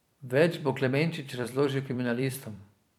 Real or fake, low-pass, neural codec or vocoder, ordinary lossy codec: fake; 19.8 kHz; vocoder, 44.1 kHz, 128 mel bands every 256 samples, BigVGAN v2; none